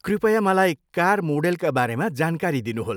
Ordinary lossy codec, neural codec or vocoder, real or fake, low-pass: none; none; real; none